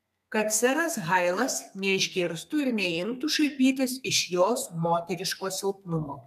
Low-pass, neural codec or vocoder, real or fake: 14.4 kHz; codec, 32 kHz, 1.9 kbps, SNAC; fake